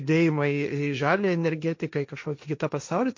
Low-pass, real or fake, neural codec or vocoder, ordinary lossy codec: 7.2 kHz; fake; codec, 16 kHz, 1.1 kbps, Voila-Tokenizer; MP3, 48 kbps